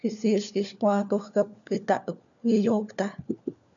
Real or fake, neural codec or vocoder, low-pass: fake; codec, 16 kHz, 4 kbps, FunCodec, trained on LibriTTS, 50 frames a second; 7.2 kHz